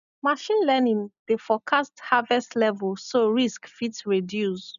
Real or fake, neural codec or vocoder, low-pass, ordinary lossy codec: real; none; 7.2 kHz; none